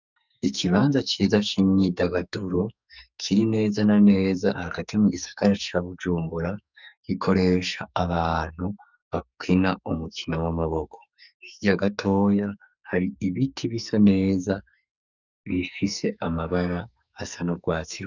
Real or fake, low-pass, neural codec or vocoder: fake; 7.2 kHz; codec, 44.1 kHz, 2.6 kbps, SNAC